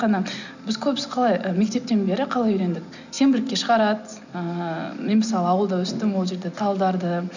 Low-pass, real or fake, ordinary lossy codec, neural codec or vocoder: 7.2 kHz; real; none; none